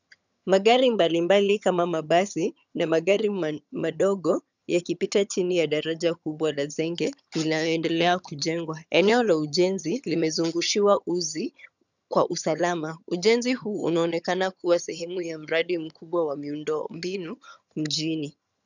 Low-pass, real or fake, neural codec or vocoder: 7.2 kHz; fake; vocoder, 22.05 kHz, 80 mel bands, HiFi-GAN